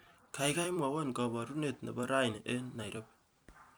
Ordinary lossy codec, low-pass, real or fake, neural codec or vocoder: none; none; fake; vocoder, 44.1 kHz, 128 mel bands every 256 samples, BigVGAN v2